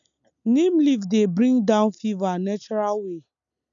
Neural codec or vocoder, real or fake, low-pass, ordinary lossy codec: none; real; 7.2 kHz; none